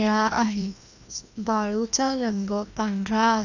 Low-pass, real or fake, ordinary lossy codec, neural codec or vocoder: 7.2 kHz; fake; none; codec, 16 kHz, 1 kbps, FreqCodec, larger model